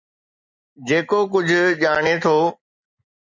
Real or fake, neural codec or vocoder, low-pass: real; none; 7.2 kHz